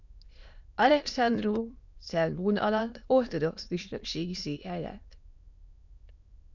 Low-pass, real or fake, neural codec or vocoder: 7.2 kHz; fake; autoencoder, 22.05 kHz, a latent of 192 numbers a frame, VITS, trained on many speakers